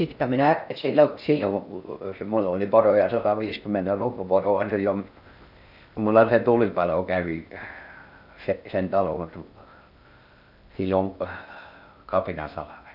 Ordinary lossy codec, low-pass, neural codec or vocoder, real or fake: none; 5.4 kHz; codec, 16 kHz in and 24 kHz out, 0.6 kbps, FocalCodec, streaming, 4096 codes; fake